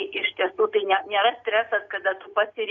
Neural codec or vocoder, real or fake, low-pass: none; real; 7.2 kHz